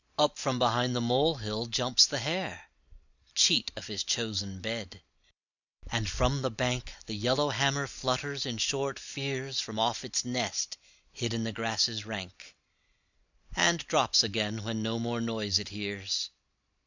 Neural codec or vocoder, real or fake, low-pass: none; real; 7.2 kHz